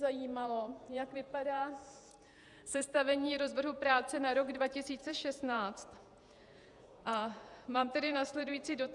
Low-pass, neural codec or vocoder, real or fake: 10.8 kHz; vocoder, 48 kHz, 128 mel bands, Vocos; fake